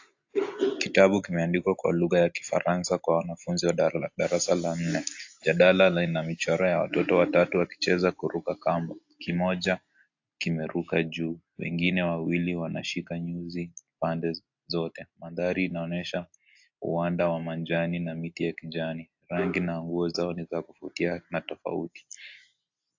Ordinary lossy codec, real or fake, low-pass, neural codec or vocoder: AAC, 48 kbps; real; 7.2 kHz; none